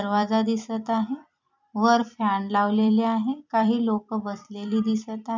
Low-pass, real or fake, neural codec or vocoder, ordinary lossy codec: 7.2 kHz; fake; vocoder, 44.1 kHz, 128 mel bands every 256 samples, BigVGAN v2; MP3, 64 kbps